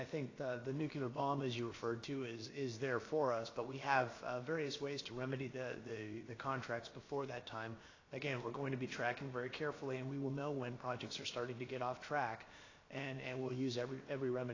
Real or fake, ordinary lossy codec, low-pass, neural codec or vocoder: fake; AAC, 32 kbps; 7.2 kHz; codec, 16 kHz, about 1 kbps, DyCAST, with the encoder's durations